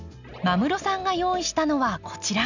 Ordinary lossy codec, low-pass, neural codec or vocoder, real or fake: none; 7.2 kHz; none; real